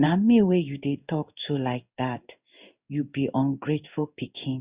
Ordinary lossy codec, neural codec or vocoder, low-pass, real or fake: Opus, 64 kbps; codec, 16 kHz in and 24 kHz out, 1 kbps, XY-Tokenizer; 3.6 kHz; fake